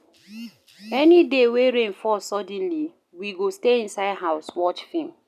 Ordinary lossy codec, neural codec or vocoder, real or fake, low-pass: none; none; real; 14.4 kHz